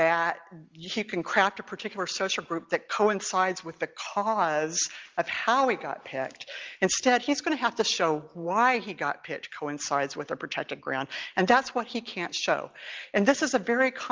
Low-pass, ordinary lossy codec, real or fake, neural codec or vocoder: 7.2 kHz; Opus, 32 kbps; real; none